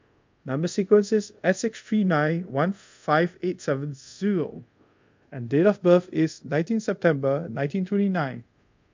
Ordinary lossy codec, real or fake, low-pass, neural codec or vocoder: MP3, 64 kbps; fake; 7.2 kHz; codec, 24 kHz, 0.5 kbps, DualCodec